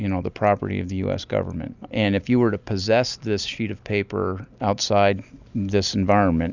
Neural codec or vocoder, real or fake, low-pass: none; real; 7.2 kHz